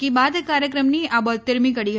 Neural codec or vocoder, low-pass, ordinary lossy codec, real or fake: none; none; none; real